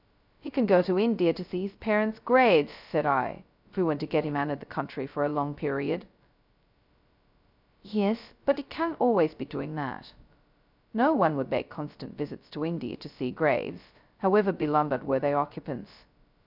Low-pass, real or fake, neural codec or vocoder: 5.4 kHz; fake; codec, 16 kHz, 0.2 kbps, FocalCodec